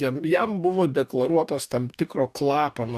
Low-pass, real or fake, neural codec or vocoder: 14.4 kHz; fake; codec, 44.1 kHz, 2.6 kbps, DAC